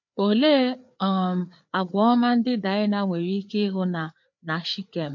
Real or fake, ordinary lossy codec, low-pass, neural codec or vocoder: fake; MP3, 48 kbps; 7.2 kHz; codec, 16 kHz, 4 kbps, FreqCodec, larger model